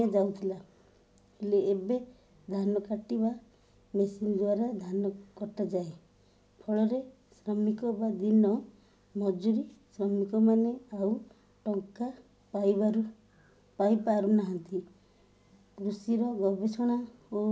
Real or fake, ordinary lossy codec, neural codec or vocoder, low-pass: real; none; none; none